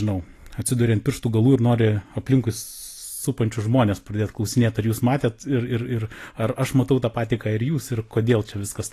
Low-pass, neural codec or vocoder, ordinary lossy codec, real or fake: 14.4 kHz; none; AAC, 48 kbps; real